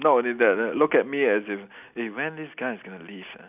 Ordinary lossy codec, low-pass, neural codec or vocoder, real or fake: none; 3.6 kHz; none; real